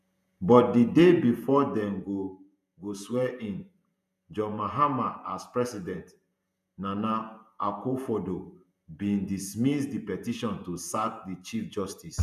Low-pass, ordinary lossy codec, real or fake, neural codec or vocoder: 14.4 kHz; none; fake; vocoder, 48 kHz, 128 mel bands, Vocos